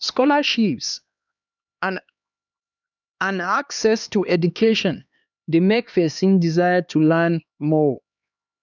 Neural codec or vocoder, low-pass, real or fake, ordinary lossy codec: codec, 16 kHz, 2 kbps, X-Codec, HuBERT features, trained on LibriSpeech; 7.2 kHz; fake; none